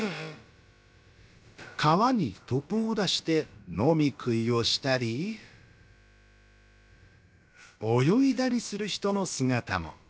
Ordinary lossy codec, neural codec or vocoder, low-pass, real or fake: none; codec, 16 kHz, about 1 kbps, DyCAST, with the encoder's durations; none; fake